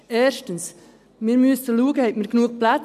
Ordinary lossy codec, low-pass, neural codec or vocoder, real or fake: none; 14.4 kHz; none; real